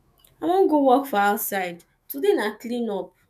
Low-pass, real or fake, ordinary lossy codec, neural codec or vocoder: 14.4 kHz; fake; none; autoencoder, 48 kHz, 128 numbers a frame, DAC-VAE, trained on Japanese speech